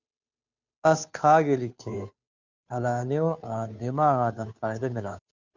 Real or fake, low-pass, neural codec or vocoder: fake; 7.2 kHz; codec, 16 kHz, 2 kbps, FunCodec, trained on Chinese and English, 25 frames a second